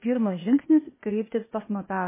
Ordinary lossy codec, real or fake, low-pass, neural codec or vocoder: MP3, 16 kbps; fake; 3.6 kHz; codec, 16 kHz, 0.8 kbps, ZipCodec